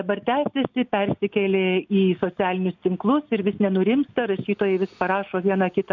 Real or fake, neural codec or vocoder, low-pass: real; none; 7.2 kHz